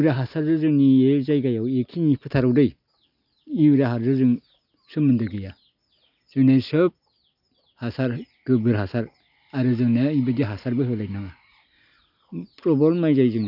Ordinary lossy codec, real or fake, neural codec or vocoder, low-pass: none; real; none; 5.4 kHz